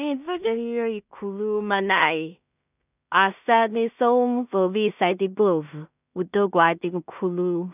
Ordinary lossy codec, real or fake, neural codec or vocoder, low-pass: none; fake; codec, 16 kHz in and 24 kHz out, 0.4 kbps, LongCat-Audio-Codec, two codebook decoder; 3.6 kHz